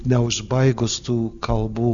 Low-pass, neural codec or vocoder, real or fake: 7.2 kHz; none; real